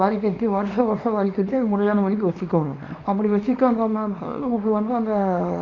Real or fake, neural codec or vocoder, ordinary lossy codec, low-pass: fake; codec, 24 kHz, 0.9 kbps, WavTokenizer, small release; MP3, 64 kbps; 7.2 kHz